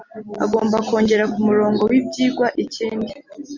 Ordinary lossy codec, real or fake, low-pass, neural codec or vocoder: Opus, 64 kbps; real; 7.2 kHz; none